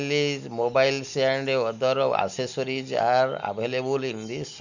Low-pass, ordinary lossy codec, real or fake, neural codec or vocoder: 7.2 kHz; none; real; none